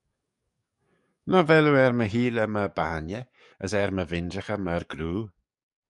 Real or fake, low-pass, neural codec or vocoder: fake; 10.8 kHz; codec, 44.1 kHz, 7.8 kbps, DAC